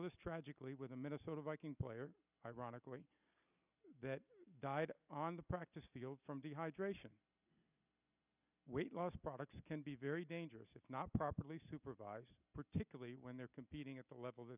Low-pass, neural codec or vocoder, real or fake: 3.6 kHz; none; real